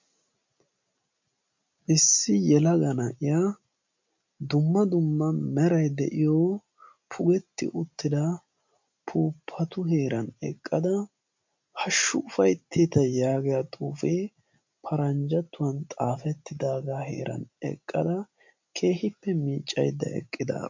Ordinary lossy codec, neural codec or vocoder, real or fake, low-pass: MP3, 64 kbps; none; real; 7.2 kHz